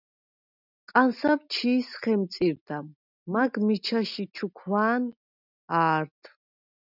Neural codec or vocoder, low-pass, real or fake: none; 5.4 kHz; real